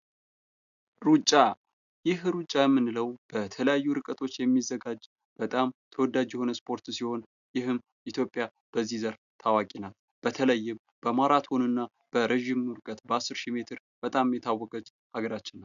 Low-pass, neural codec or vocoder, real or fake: 7.2 kHz; none; real